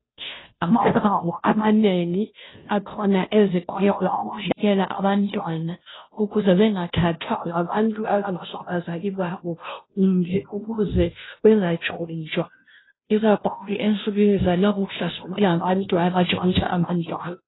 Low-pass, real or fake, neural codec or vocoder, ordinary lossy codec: 7.2 kHz; fake; codec, 16 kHz, 0.5 kbps, FunCodec, trained on Chinese and English, 25 frames a second; AAC, 16 kbps